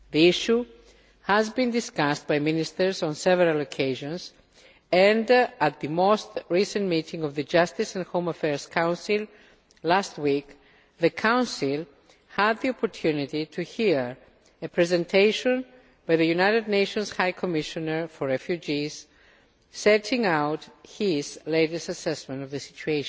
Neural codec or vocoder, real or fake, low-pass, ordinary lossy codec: none; real; none; none